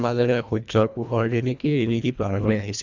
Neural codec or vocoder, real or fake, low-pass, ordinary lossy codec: codec, 24 kHz, 1.5 kbps, HILCodec; fake; 7.2 kHz; none